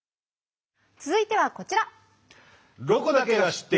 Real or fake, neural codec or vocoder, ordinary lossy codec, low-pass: real; none; none; none